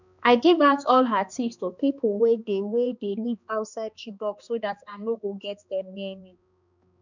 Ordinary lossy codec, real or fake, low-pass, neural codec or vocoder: none; fake; 7.2 kHz; codec, 16 kHz, 2 kbps, X-Codec, HuBERT features, trained on balanced general audio